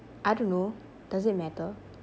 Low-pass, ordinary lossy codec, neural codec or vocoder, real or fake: none; none; none; real